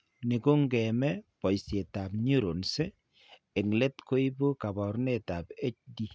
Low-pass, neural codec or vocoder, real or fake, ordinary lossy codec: none; none; real; none